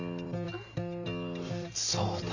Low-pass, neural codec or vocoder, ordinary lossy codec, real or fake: 7.2 kHz; none; none; real